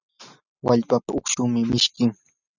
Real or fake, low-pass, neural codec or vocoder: real; 7.2 kHz; none